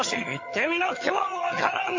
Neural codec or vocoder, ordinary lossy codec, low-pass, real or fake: vocoder, 22.05 kHz, 80 mel bands, HiFi-GAN; MP3, 32 kbps; 7.2 kHz; fake